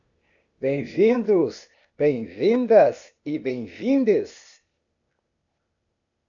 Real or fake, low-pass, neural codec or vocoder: fake; 7.2 kHz; codec, 16 kHz, 0.8 kbps, ZipCodec